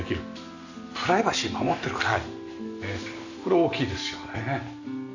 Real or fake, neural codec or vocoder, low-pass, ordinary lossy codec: real; none; 7.2 kHz; none